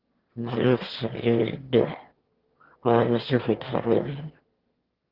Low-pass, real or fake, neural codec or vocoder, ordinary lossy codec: 5.4 kHz; fake; autoencoder, 22.05 kHz, a latent of 192 numbers a frame, VITS, trained on one speaker; Opus, 16 kbps